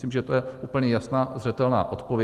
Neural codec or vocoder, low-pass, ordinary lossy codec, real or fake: none; 14.4 kHz; Opus, 24 kbps; real